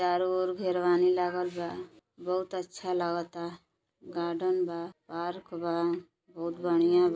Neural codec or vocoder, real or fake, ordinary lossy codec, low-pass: none; real; none; none